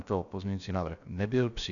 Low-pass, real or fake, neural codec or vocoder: 7.2 kHz; fake; codec, 16 kHz, about 1 kbps, DyCAST, with the encoder's durations